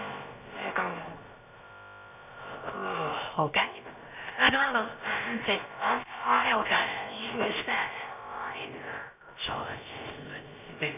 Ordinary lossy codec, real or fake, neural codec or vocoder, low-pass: none; fake; codec, 16 kHz, about 1 kbps, DyCAST, with the encoder's durations; 3.6 kHz